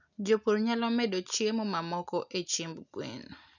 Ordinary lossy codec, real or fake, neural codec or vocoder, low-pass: none; real; none; 7.2 kHz